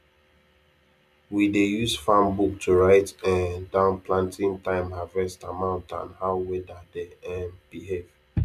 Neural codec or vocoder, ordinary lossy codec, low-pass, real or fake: none; MP3, 96 kbps; 14.4 kHz; real